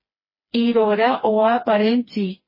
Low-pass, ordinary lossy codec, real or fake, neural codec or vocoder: 5.4 kHz; MP3, 24 kbps; fake; codec, 16 kHz, 2 kbps, FreqCodec, smaller model